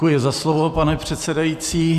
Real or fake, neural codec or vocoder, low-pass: fake; vocoder, 44.1 kHz, 128 mel bands every 512 samples, BigVGAN v2; 14.4 kHz